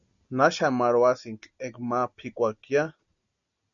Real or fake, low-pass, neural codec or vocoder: real; 7.2 kHz; none